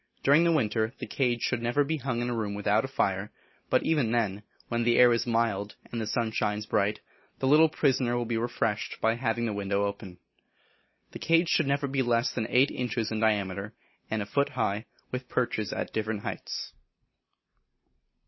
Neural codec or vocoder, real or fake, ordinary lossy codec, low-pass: none; real; MP3, 24 kbps; 7.2 kHz